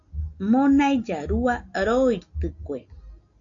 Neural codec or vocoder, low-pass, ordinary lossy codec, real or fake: none; 7.2 kHz; AAC, 48 kbps; real